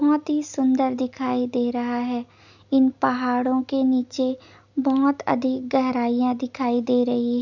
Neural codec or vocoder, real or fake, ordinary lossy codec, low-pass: none; real; none; 7.2 kHz